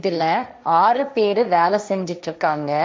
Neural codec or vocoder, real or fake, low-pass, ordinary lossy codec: codec, 16 kHz, 1.1 kbps, Voila-Tokenizer; fake; none; none